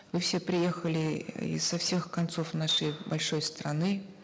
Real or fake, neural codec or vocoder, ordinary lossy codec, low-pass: real; none; none; none